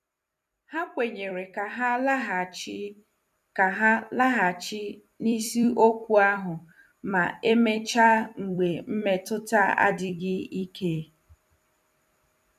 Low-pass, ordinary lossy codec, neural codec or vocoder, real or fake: 14.4 kHz; none; vocoder, 44.1 kHz, 128 mel bands every 256 samples, BigVGAN v2; fake